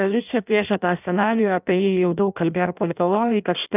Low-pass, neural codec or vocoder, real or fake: 3.6 kHz; codec, 16 kHz in and 24 kHz out, 0.6 kbps, FireRedTTS-2 codec; fake